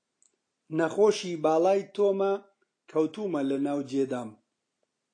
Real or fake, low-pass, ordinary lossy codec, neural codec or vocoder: real; 9.9 kHz; AAC, 48 kbps; none